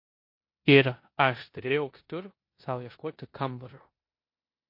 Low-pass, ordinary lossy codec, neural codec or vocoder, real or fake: 5.4 kHz; MP3, 32 kbps; codec, 16 kHz in and 24 kHz out, 0.9 kbps, LongCat-Audio-Codec, four codebook decoder; fake